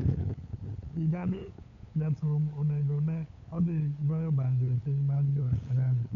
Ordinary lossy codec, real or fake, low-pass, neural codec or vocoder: none; fake; 7.2 kHz; codec, 16 kHz, 8 kbps, FunCodec, trained on LibriTTS, 25 frames a second